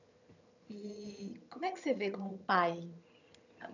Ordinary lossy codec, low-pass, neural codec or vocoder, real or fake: none; 7.2 kHz; vocoder, 22.05 kHz, 80 mel bands, HiFi-GAN; fake